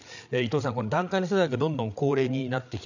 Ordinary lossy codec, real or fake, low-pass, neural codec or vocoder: none; fake; 7.2 kHz; codec, 16 kHz, 8 kbps, FreqCodec, larger model